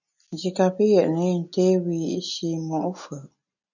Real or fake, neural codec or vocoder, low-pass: real; none; 7.2 kHz